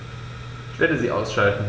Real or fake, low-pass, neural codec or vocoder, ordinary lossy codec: real; none; none; none